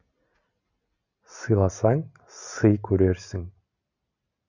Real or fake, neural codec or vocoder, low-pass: real; none; 7.2 kHz